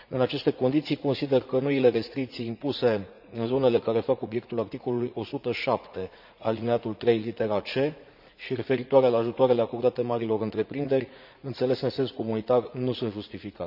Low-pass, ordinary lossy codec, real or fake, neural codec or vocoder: 5.4 kHz; none; fake; vocoder, 44.1 kHz, 80 mel bands, Vocos